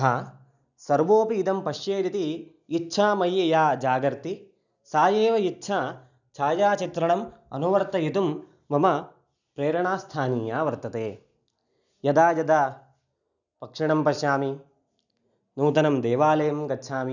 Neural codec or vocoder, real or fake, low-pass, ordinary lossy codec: none; real; 7.2 kHz; none